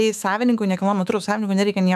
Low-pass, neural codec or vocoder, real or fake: 14.4 kHz; autoencoder, 48 kHz, 128 numbers a frame, DAC-VAE, trained on Japanese speech; fake